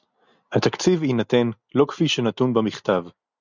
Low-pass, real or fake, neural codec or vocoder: 7.2 kHz; real; none